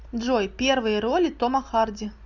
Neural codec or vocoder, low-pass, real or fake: none; 7.2 kHz; real